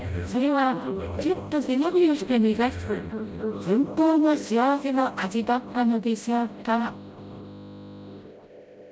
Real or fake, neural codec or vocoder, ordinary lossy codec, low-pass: fake; codec, 16 kHz, 0.5 kbps, FreqCodec, smaller model; none; none